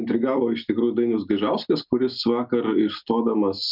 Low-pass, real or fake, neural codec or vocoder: 5.4 kHz; real; none